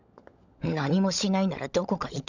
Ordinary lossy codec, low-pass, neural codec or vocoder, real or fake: none; 7.2 kHz; codec, 16 kHz, 16 kbps, FunCodec, trained on LibriTTS, 50 frames a second; fake